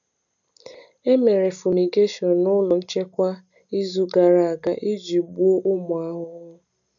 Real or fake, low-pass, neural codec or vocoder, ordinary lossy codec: real; 7.2 kHz; none; none